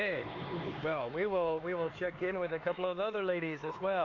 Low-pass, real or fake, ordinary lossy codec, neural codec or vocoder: 7.2 kHz; fake; AAC, 32 kbps; codec, 16 kHz, 4 kbps, X-Codec, HuBERT features, trained on LibriSpeech